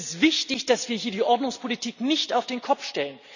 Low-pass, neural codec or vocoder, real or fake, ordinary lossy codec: 7.2 kHz; none; real; none